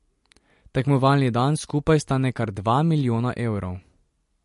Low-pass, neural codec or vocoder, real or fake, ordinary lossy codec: 14.4 kHz; none; real; MP3, 48 kbps